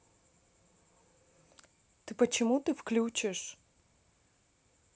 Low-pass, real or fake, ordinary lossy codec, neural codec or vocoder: none; real; none; none